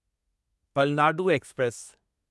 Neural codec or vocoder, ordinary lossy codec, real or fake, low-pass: codec, 24 kHz, 1 kbps, SNAC; none; fake; none